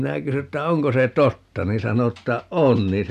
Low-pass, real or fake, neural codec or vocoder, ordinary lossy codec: 14.4 kHz; real; none; none